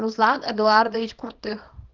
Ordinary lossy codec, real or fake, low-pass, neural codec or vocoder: Opus, 24 kbps; fake; 7.2 kHz; codec, 24 kHz, 0.9 kbps, WavTokenizer, small release